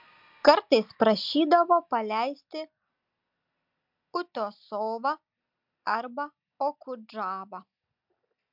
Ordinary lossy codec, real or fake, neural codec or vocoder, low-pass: MP3, 48 kbps; real; none; 5.4 kHz